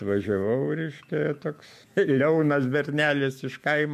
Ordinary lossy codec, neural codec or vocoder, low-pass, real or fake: MP3, 64 kbps; autoencoder, 48 kHz, 128 numbers a frame, DAC-VAE, trained on Japanese speech; 14.4 kHz; fake